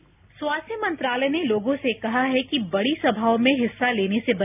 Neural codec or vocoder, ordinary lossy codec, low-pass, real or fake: none; none; 3.6 kHz; real